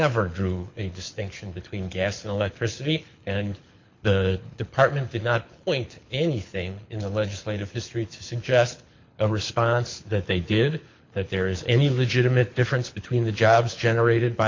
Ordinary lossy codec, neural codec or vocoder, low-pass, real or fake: MP3, 48 kbps; codec, 24 kHz, 6 kbps, HILCodec; 7.2 kHz; fake